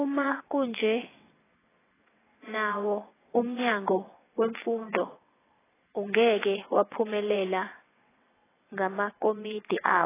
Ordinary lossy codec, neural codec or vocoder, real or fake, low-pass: AAC, 16 kbps; vocoder, 22.05 kHz, 80 mel bands, WaveNeXt; fake; 3.6 kHz